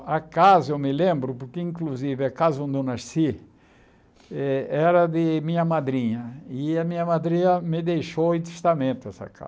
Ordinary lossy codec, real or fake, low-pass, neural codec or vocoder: none; real; none; none